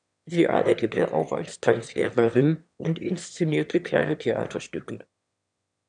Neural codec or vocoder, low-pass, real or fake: autoencoder, 22.05 kHz, a latent of 192 numbers a frame, VITS, trained on one speaker; 9.9 kHz; fake